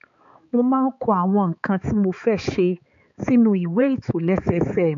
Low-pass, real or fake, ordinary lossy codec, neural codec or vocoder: 7.2 kHz; fake; MP3, 48 kbps; codec, 16 kHz, 4 kbps, X-Codec, HuBERT features, trained on balanced general audio